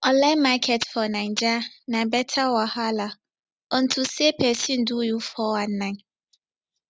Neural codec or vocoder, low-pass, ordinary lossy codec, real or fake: none; none; none; real